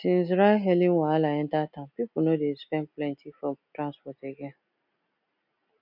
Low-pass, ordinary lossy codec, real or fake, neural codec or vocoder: 5.4 kHz; none; real; none